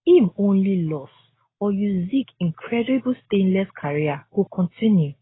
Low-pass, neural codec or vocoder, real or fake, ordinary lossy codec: 7.2 kHz; none; real; AAC, 16 kbps